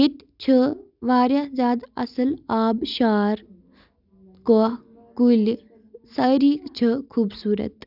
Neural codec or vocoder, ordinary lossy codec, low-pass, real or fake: none; none; 5.4 kHz; real